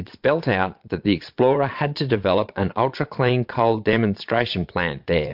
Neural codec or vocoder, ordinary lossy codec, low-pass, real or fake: vocoder, 22.05 kHz, 80 mel bands, Vocos; MP3, 48 kbps; 5.4 kHz; fake